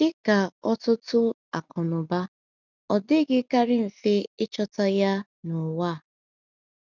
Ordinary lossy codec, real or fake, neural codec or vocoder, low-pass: none; real; none; 7.2 kHz